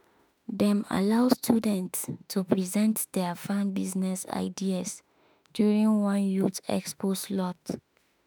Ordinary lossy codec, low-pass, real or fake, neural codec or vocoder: none; none; fake; autoencoder, 48 kHz, 32 numbers a frame, DAC-VAE, trained on Japanese speech